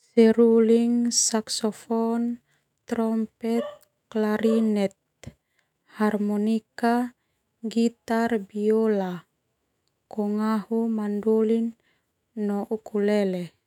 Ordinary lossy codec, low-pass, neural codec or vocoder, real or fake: none; 19.8 kHz; autoencoder, 48 kHz, 128 numbers a frame, DAC-VAE, trained on Japanese speech; fake